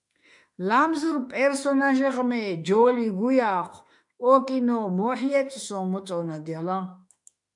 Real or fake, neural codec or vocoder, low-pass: fake; autoencoder, 48 kHz, 32 numbers a frame, DAC-VAE, trained on Japanese speech; 10.8 kHz